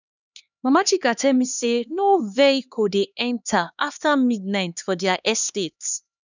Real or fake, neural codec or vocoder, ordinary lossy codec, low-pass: fake; codec, 16 kHz, 2 kbps, X-Codec, HuBERT features, trained on LibriSpeech; none; 7.2 kHz